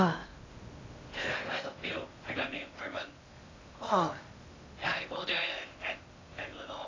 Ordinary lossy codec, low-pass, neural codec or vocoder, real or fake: AAC, 32 kbps; 7.2 kHz; codec, 16 kHz in and 24 kHz out, 0.6 kbps, FocalCodec, streaming, 2048 codes; fake